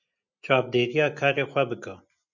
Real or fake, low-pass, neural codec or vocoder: real; 7.2 kHz; none